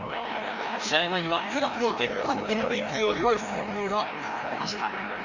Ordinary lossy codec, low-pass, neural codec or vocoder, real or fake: Opus, 64 kbps; 7.2 kHz; codec, 16 kHz, 1 kbps, FreqCodec, larger model; fake